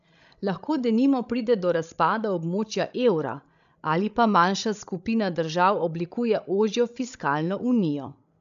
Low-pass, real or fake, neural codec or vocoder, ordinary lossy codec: 7.2 kHz; fake; codec, 16 kHz, 16 kbps, FreqCodec, larger model; AAC, 96 kbps